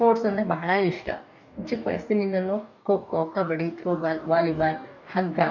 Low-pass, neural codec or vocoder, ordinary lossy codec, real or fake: 7.2 kHz; codec, 44.1 kHz, 2.6 kbps, DAC; none; fake